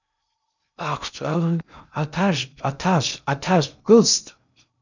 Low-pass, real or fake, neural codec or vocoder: 7.2 kHz; fake; codec, 16 kHz in and 24 kHz out, 0.6 kbps, FocalCodec, streaming, 2048 codes